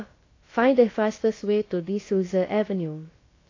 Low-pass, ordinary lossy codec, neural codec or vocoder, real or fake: 7.2 kHz; AAC, 32 kbps; codec, 16 kHz, about 1 kbps, DyCAST, with the encoder's durations; fake